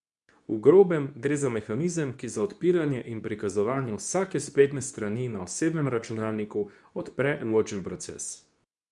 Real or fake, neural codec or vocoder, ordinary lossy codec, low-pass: fake; codec, 24 kHz, 0.9 kbps, WavTokenizer, medium speech release version 2; none; 10.8 kHz